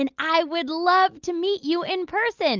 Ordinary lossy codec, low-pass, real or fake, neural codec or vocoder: Opus, 32 kbps; 7.2 kHz; real; none